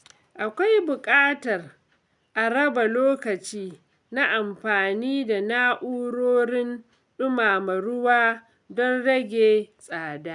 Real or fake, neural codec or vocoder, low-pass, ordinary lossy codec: real; none; 10.8 kHz; none